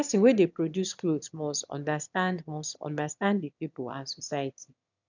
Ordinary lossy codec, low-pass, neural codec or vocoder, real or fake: none; 7.2 kHz; autoencoder, 22.05 kHz, a latent of 192 numbers a frame, VITS, trained on one speaker; fake